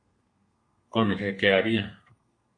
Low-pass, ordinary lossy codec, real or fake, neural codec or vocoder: 9.9 kHz; AAC, 48 kbps; fake; codec, 32 kHz, 1.9 kbps, SNAC